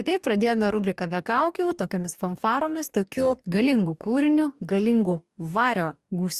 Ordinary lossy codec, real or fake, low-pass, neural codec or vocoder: Opus, 64 kbps; fake; 14.4 kHz; codec, 44.1 kHz, 2.6 kbps, DAC